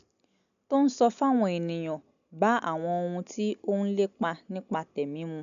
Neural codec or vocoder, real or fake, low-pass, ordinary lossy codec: none; real; 7.2 kHz; none